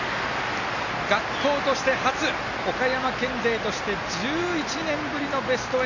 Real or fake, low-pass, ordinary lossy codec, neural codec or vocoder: real; 7.2 kHz; none; none